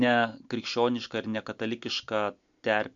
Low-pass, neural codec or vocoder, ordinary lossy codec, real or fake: 7.2 kHz; none; MP3, 64 kbps; real